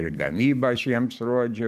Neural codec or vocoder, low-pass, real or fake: codec, 44.1 kHz, 7.8 kbps, DAC; 14.4 kHz; fake